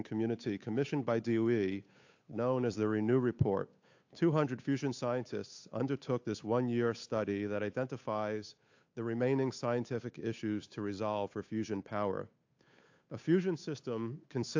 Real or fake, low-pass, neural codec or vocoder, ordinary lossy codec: fake; 7.2 kHz; codec, 16 kHz, 8 kbps, FunCodec, trained on Chinese and English, 25 frames a second; AAC, 48 kbps